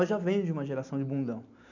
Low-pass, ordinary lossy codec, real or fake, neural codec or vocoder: 7.2 kHz; none; real; none